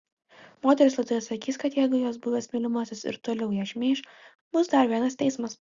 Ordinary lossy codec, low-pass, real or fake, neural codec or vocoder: Opus, 64 kbps; 7.2 kHz; real; none